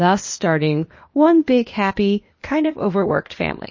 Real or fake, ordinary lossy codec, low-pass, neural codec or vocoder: fake; MP3, 32 kbps; 7.2 kHz; codec, 16 kHz, 0.8 kbps, ZipCodec